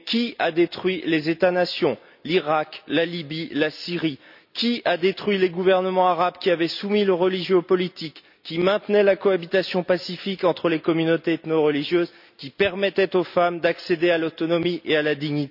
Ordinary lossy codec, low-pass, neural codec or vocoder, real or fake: none; 5.4 kHz; none; real